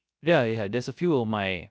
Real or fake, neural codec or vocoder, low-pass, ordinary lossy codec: fake; codec, 16 kHz, 0.3 kbps, FocalCodec; none; none